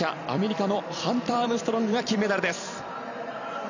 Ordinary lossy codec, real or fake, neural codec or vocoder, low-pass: none; fake; vocoder, 44.1 kHz, 128 mel bands every 256 samples, BigVGAN v2; 7.2 kHz